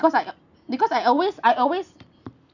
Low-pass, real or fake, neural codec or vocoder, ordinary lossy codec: 7.2 kHz; real; none; none